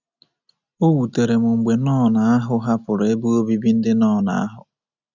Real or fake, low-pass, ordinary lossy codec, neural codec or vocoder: real; 7.2 kHz; none; none